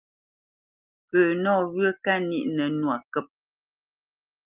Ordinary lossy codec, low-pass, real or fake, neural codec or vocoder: Opus, 32 kbps; 3.6 kHz; real; none